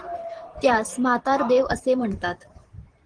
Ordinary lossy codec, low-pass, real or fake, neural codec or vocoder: Opus, 16 kbps; 9.9 kHz; fake; vocoder, 44.1 kHz, 128 mel bands every 512 samples, BigVGAN v2